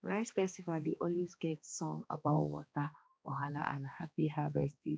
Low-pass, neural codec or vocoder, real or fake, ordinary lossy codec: none; codec, 16 kHz, 2 kbps, X-Codec, HuBERT features, trained on balanced general audio; fake; none